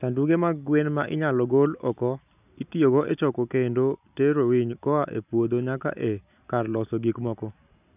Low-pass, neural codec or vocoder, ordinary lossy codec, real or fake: 3.6 kHz; none; none; real